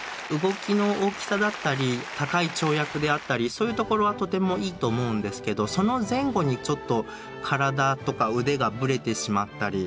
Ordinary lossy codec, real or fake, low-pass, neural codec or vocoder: none; real; none; none